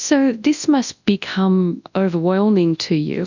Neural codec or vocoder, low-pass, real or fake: codec, 24 kHz, 0.9 kbps, WavTokenizer, large speech release; 7.2 kHz; fake